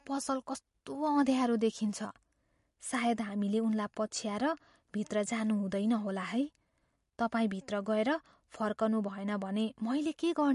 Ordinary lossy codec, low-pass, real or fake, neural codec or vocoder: MP3, 48 kbps; 14.4 kHz; real; none